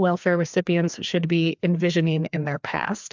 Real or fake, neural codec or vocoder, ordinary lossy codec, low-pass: fake; codec, 16 kHz, 2 kbps, FreqCodec, larger model; MP3, 64 kbps; 7.2 kHz